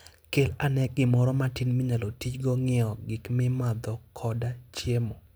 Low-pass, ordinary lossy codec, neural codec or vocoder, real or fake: none; none; none; real